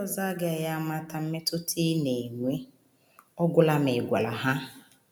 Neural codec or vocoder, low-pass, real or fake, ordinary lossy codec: none; none; real; none